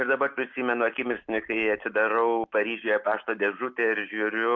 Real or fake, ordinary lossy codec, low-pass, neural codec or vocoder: real; MP3, 64 kbps; 7.2 kHz; none